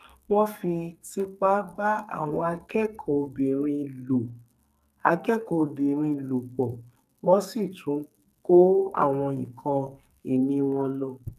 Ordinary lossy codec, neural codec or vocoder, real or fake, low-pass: none; codec, 44.1 kHz, 2.6 kbps, SNAC; fake; 14.4 kHz